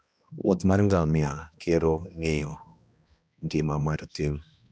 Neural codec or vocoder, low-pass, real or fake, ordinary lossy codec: codec, 16 kHz, 1 kbps, X-Codec, HuBERT features, trained on balanced general audio; none; fake; none